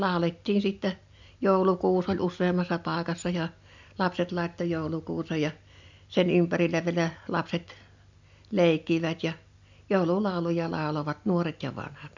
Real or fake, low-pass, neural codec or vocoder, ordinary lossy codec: real; 7.2 kHz; none; MP3, 64 kbps